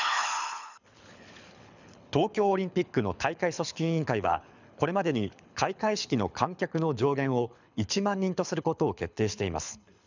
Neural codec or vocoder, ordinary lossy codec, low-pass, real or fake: codec, 24 kHz, 6 kbps, HILCodec; none; 7.2 kHz; fake